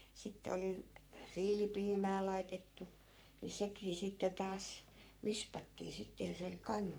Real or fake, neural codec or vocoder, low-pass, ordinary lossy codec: fake; codec, 44.1 kHz, 3.4 kbps, Pupu-Codec; none; none